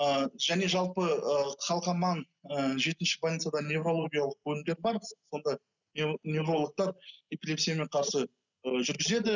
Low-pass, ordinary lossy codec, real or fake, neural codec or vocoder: 7.2 kHz; none; real; none